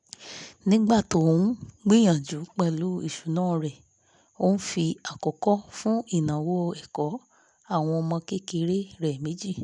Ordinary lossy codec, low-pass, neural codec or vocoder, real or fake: none; 10.8 kHz; none; real